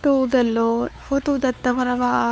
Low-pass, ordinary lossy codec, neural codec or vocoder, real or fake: none; none; codec, 16 kHz, 4 kbps, X-Codec, WavLM features, trained on Multilingual LibriSpeech; fake